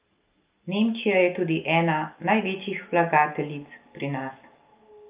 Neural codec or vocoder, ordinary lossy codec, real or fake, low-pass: none; Opus, 24 kbps; real; 3.6 kHz